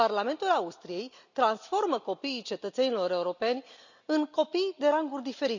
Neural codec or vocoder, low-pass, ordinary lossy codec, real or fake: none; 7.2 kHz; none; real